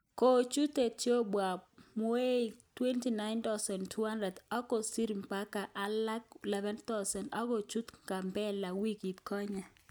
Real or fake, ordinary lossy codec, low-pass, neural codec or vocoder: real; none; none; none